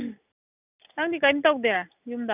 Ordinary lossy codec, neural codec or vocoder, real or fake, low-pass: none; none; real; 3.6 kHz